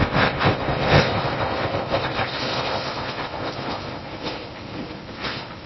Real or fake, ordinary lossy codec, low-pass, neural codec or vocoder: fake; MP3, 24 kbps; 7.2 kHz; codec, 16 kHz in and 24 kHz out, 0.6 kbps, FocalCodec, streaming, 4096 codes